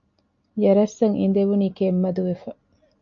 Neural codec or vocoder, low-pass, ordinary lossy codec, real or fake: none; 7.2 kHz; MP3, 48 kbps; real